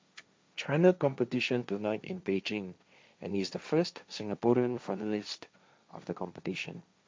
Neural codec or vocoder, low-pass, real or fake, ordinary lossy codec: codec, 16 kHz, 1.1 kbps, Voila-Tokenizer; none; fake; none